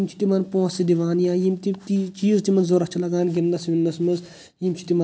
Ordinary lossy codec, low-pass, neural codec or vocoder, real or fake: none; none; none; real